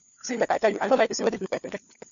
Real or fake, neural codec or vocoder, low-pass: fake; codec, 16 kHz, 4 kbps, FunCodec, trained on LibriTTS, 50 frames a second; 7.2 kHz